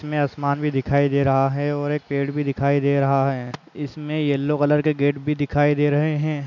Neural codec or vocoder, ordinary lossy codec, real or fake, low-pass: none; none; real; 7.2 kHz